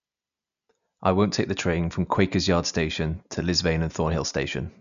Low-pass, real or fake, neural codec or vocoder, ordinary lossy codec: 7.2 kHz; real; none; none